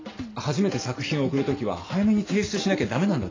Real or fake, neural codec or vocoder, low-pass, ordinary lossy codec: real; none; 7.2 kHz; AAC, 32 kbps